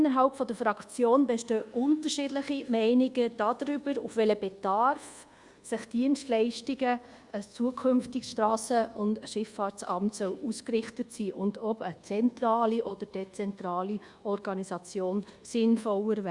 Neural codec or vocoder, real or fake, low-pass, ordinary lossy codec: codec, 24 kHz, 1.2 kbps, DualCodec; fake; 10.8 kHz; Opus, 64 kbps